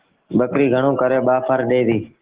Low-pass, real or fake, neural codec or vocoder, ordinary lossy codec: 3.6 kHz; real; none; Opus, 16 kbps